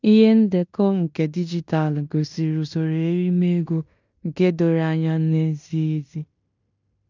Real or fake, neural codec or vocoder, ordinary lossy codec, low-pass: fake; codec, 16 kHz in and 24 kHz out, 0.9 kbps, LongCat-Audio-Codec, fine tuned four codebook decoder; none; 7.2 kHz